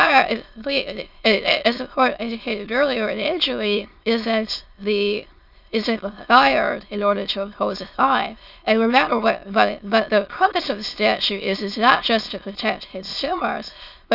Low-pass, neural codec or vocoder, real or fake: 5.4 kHz; autoencoder, 22.05 kHz, a latent of 192 numbers a frame, VITS, trained on many speakers; fake